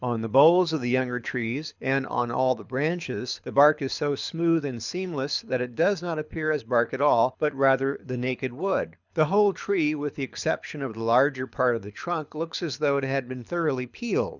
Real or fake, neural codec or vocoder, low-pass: fake; codec, 24 kHz, 6 kbps, HILCodec; 7.2 kHz